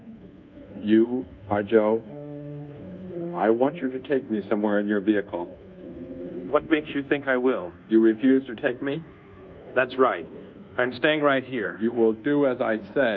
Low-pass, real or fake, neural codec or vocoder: 7.2 kHz; fake; codec, 24 kHz, 1.2 kbps, DualCodec